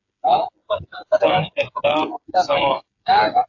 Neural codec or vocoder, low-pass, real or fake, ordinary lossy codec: codec, 16 kHz, 8 kbps, FreqCodec, smaller model; 7.2 kHz; fake; AAC, 48 kbps